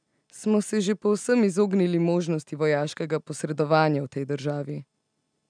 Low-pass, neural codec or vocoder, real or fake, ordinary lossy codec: 9.9 kHz; none; real; none